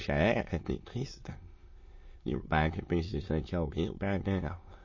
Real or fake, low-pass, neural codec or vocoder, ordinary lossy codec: fake; 7.2 kHz; autoencoder, 22.05 kHz, a latent of 192 numbers a frame, VITS, trained on many speakers; MP3, 32 kbps